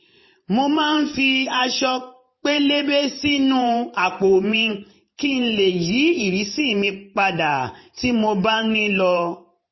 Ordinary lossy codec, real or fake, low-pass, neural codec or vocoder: MP3, 24 kbps; fake; 7.2 kHz; vocoder, 44.1 kHz, 128 mel bands every 512 samples, BigVGAN v2